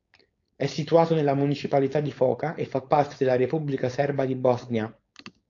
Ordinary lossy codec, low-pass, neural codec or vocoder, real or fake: AAC, 48 kbps; 7.2 kHz; codec, 16 kHz, 4.8 kbps, FACodec; fake